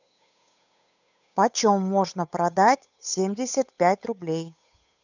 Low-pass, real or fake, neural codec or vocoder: 7.2 kHz; fake; codec, 16 kHz, 8 kbps, FunCodec, trained on Chinese and English, 25 frames a second